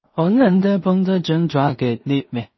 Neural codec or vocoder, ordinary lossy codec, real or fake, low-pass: codec, 16 kHz in and 24 kHz out, 0.4 kbps, LongCat-Audio-Codec, two codebook decoder; MP3, 24 kbps; fake; 7.2 kHz